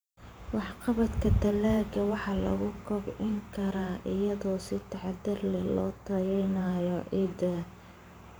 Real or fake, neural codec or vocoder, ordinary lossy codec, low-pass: fake; vocoder, 44.1 kHz, 128 mel bands every 512 samples, BigVGAN v2; none; none